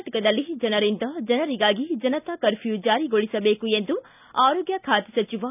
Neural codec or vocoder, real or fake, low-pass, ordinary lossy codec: none; real; 3.6 kHz; none